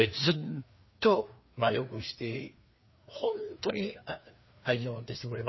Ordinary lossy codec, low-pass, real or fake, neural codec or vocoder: MP3, 24 kbps; 7.2 kHz; fake; codec, 16 kHz, 2 kbps, FreqCodec, larger model